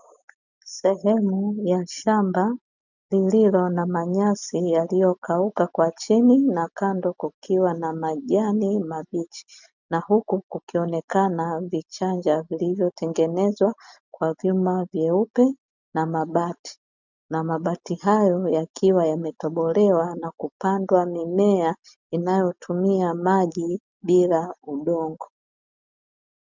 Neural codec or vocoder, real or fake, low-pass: none; real; 7.2 kHz